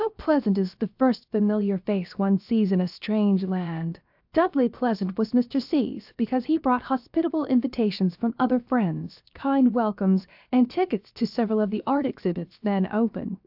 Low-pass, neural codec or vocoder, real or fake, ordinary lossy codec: 5.4 kHz; codec, 16 kHz, 0.7 kbps, FocalCodec; fake; AAC, 48 kbps